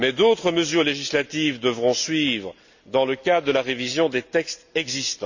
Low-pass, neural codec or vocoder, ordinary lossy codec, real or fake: 7.2 kHz; none; none; real